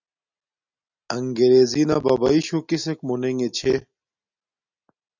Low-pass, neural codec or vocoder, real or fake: 7.2 kHz; none; real